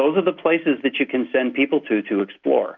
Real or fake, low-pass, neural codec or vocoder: real; 7.2 kHz; none